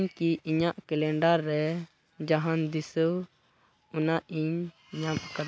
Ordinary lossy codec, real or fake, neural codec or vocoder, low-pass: none; real; none; none